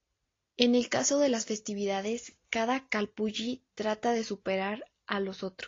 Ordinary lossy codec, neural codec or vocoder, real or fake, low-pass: AAC, 32 kbps; none; real; 7.2 kHz